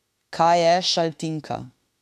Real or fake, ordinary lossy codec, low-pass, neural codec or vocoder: fake; AAC, 96 kbps; 14.4 kHz; autoencoder, 48 kHz, 32 numbers a frame, DAC-VAE, trained on Japanese speech